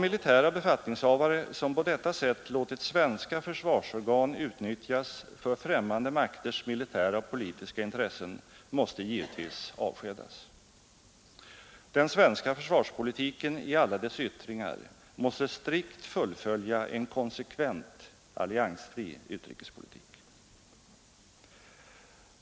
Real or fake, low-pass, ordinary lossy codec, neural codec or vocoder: real; none; none; none